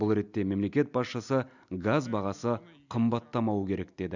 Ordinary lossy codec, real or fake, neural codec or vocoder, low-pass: none; real; none; 7.2 kHz